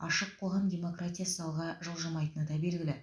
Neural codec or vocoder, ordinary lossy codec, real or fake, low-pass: none; none; real; none